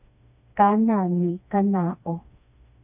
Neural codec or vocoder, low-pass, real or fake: codec, 16 kHz, 2 kbps, FreqCodec, smaller model; 3.6 kHz; fake